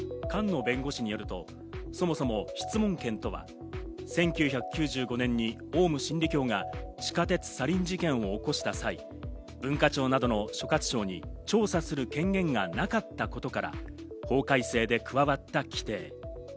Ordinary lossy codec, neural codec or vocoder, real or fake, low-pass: none; none; real; none